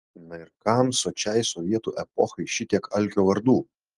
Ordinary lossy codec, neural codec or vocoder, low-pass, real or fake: Opus, 24 kbps; none; 10.8 kHz; real